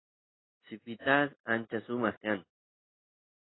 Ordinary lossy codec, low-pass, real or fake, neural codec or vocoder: AAC, 16 kbps; 7.2 kHz; real; none